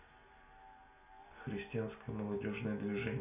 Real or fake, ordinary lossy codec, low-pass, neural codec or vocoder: real; none; 3.6 kHz; none